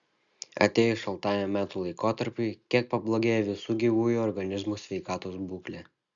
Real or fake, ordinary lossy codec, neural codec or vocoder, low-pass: real; Opus, 64 kbps; none; 7.2 kHz